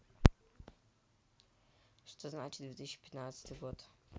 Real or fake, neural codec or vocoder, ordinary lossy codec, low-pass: real; none; none; none